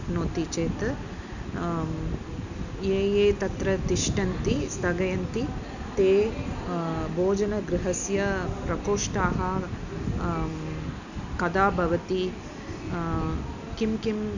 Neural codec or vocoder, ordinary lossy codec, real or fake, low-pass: none; none; real; 7.2 kHz